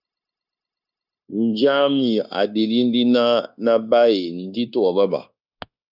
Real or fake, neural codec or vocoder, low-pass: fake; codec, 16 kHz, 0.9 kbps, LongCat-Audio-Codec; 5.4 kHz